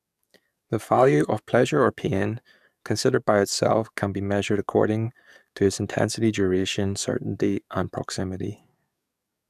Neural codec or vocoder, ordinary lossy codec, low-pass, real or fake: codec, 44.1 kHz, 7.8 kbps, DAC; none; 14.4 kHz; fake